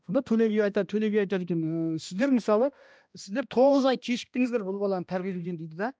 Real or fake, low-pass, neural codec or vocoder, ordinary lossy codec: fake; none; codec, 16 kHz, 1 kbps, X-Codec, HuBERT features, trained on balanced general audio; none